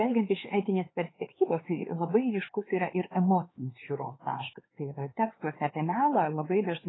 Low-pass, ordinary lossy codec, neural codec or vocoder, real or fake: 7.2 kHz; AAC, 16 kbps; codec, 16 kHz, 4 kbps, X-Codec, HuBERT features, trained on LibriSpeech; fake